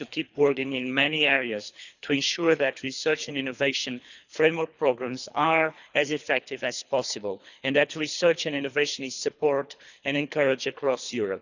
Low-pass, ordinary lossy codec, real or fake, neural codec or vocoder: 7.2 kHz; none; fake; codec, 24 kHz, 3 kbps, HILCodec